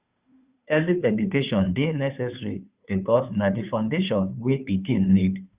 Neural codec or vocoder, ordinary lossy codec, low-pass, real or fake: codec, 16 kHz, 2 kbps, FunCodec, trained on Chinese and English, 25 frames a second; Opus, 64 kbps; 3.6 kHz; fake